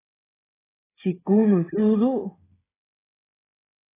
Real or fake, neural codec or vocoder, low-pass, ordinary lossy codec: fake; codec, 16 kHz, 8 kbps, FreqCodec, smaller model; 3.6 kHz; AAC, 16 kbps